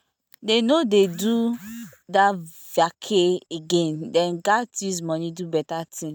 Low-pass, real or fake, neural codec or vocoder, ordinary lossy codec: none; real; none; none